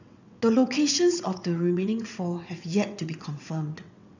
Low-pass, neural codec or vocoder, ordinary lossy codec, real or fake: 7.2 kHz; vocoder, 22.05 kHz, 80 mel bands, WaveNeXt; none; fake